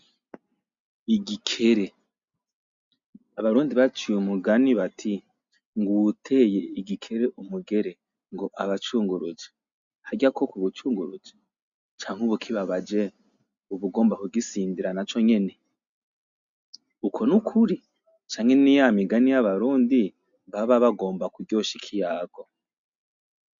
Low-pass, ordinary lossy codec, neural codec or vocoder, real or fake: 7.2 kHz; MP3, 64 kbps; none; real